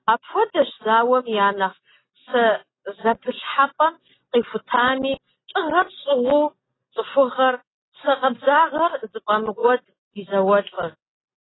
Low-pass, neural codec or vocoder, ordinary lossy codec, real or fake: 7.2 kHz; none; AAC, 16 kbps; real